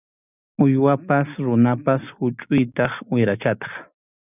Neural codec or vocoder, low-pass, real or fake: none; 3.6 kHz; real